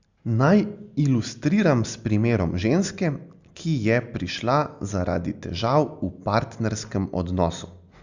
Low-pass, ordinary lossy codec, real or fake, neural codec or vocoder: 7.2 kHz; Opus, 64 kbps; real; none